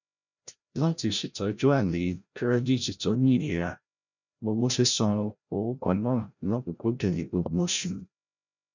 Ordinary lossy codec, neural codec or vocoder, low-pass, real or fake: none; codec, 16 kHz, 0.5 kbps, FreqCodec, larger model; 7.2 kHz; fake